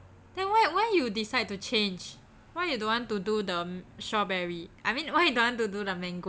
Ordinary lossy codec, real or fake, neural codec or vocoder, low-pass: none; real; none; none